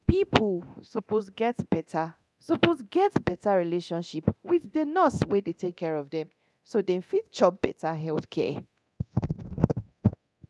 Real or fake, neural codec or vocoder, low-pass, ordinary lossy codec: fake; codec, 24 kHz, 0.9 kbps, DualCodec; none; none